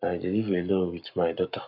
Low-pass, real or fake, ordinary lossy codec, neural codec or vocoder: 5.4 kHz; fake; none; codec, 44.1 kHz, 7.8 kbps, Pupu-Codec